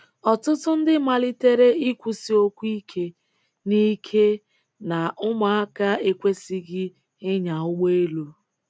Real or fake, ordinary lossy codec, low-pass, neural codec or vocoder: real; none; none; none